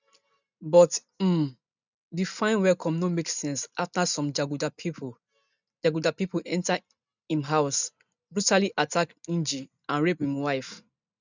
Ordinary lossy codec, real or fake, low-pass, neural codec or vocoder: none; real; 7.2 kHz; none